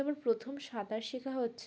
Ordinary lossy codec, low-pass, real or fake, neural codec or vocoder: none; none; real; none